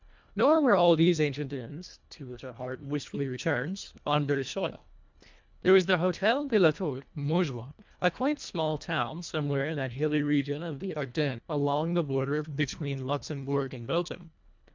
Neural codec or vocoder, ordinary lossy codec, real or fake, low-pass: codec, 24 kHz, 1.5 kbps, HILCodec; MP3, 64 kbps; fake; 7.2 kHz